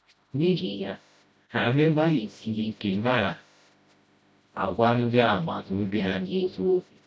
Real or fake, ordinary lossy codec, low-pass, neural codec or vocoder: fake; none; none; codec, 16 kHz, 0.5 kbps, FreqCodec, smaller model